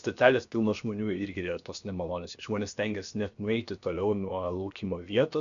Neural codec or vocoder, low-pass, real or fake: codec, 16 kHz, 0.7 kbps, FocalCodec; 7.2 kHz; fake